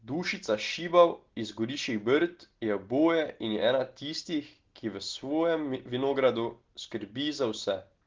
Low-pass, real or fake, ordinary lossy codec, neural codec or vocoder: 7.2 kHz; real; Opus, 16 kbps; none